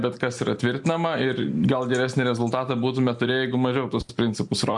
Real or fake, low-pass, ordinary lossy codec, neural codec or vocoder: real; 10.8 kHz; MP3, 64 kbps; none